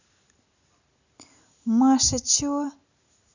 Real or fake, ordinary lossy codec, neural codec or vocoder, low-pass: real; none; none; 7.2 kHz